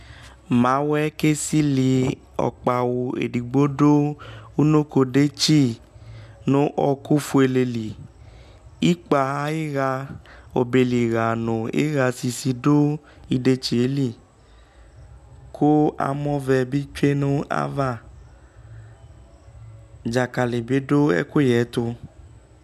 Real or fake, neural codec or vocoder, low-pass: real; none; 14.4 kHz